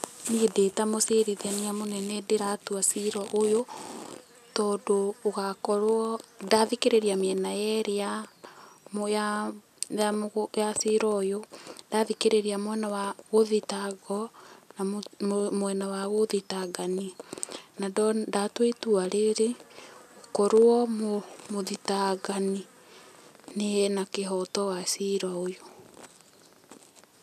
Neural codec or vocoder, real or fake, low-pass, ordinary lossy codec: none; real; 14.4 kHz; none